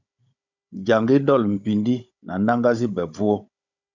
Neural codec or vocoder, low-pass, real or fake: codec, 16 kHz, 16 kbps, FunCodec, trained on Chinese and English, 50 frames a second; 7.2 kHz; fake